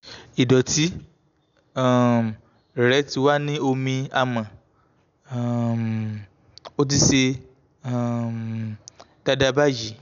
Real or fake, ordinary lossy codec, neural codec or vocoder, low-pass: real; none; none; 7.2 kHz